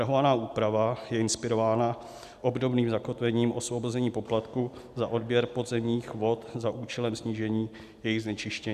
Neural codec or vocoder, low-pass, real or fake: none; 14.4 kHz; real